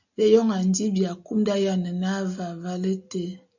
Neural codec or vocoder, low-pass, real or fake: none; 7.2 kHz; real